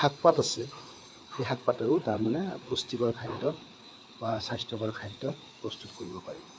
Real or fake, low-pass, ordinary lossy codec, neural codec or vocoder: fake; none; none; codec, 16 kHz, 4 kbps, FreqCodec, larger model